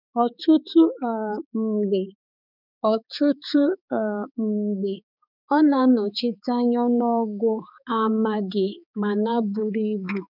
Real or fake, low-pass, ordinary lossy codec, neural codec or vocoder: fake; 5.4 kHz; none; codec, 16 kHz, 4 kbps, X-Codec, HuBERT features, trained on balanced general audio